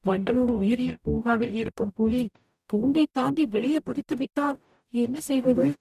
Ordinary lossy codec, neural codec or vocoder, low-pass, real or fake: none; codec, 44.1 kHz, 0.9 kbps, DAC; 14.4 kHz; fake